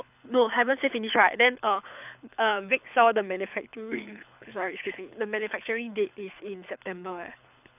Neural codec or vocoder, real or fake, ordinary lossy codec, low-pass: codec, 24 kHz, 6 kbps, HILCodec; fake; none; 3.6 kHz